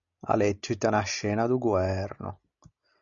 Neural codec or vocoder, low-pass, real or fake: none; 7.2 kHz; real